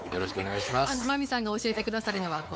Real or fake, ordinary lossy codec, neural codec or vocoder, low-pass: fake; none; codec, 16 kHz, 4 kbps, X-Codec, WavLM features, trained on Multilingual LibriSpeech; none